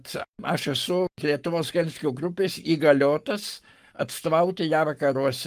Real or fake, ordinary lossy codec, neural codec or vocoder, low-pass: fake; Opus, 32 kbps; codec, 44.1 kHz, 7.8 kbps, Pupu-Codec; 14.4 kHz